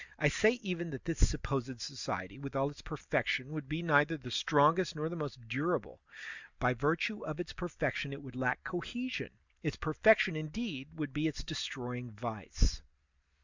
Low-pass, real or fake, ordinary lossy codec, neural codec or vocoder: 7.2 kHz; real; Opus, 64 kbps; none